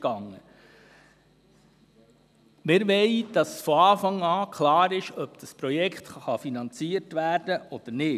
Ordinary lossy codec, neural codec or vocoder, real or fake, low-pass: none; none; real; 14.4 kHz